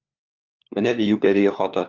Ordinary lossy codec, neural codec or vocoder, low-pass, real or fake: Opus, 24 kbps; codec, 16 kHz, 4 kbps, FunCodec, trained on LibriTTS, 50 frames a second; 7.2 kHz; fake